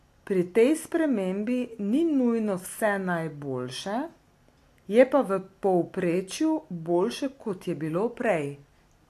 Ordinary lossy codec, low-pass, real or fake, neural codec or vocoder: AAC, 64 kbps; 14.4 kHz; real; none